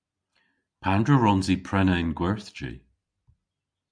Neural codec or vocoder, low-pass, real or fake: none; 9.9 kHz; real